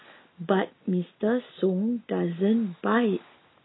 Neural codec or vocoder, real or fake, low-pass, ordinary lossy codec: none; real; 7.2 kHz; AAC, 16 kbps